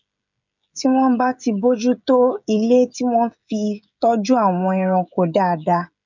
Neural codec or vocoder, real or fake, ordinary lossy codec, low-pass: codec, 16 kHz, 16 kbps, FreqCodec, smaller model; fake; none; 7.2 kHz